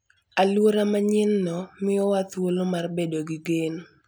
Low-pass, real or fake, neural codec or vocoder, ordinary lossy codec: none; real; none; none